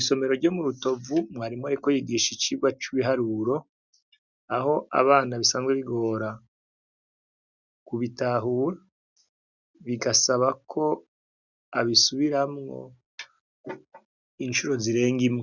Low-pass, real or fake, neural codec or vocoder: 7.2 kHz; real; none